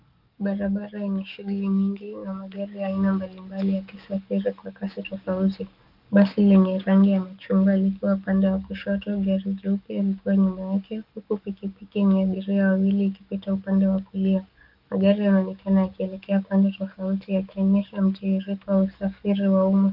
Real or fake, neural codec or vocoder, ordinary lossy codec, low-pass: fake; codec, 44.1 kHz, 7.8 kbps, DAC; Opus, 24 kbps; 5.4 kHz